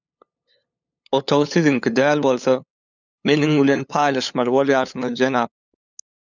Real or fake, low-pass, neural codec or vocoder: fake; 7.2 kHz; codec, 16 kHz, 8 kbps, FunCodec, trained on LibriTTS, 25 frames a second